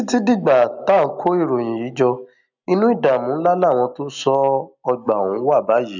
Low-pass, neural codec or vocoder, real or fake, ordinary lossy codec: 7.2 kHz; none; real; none